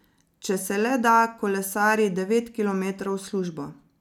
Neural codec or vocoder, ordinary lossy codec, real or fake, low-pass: none; none; real; 19.8 kHz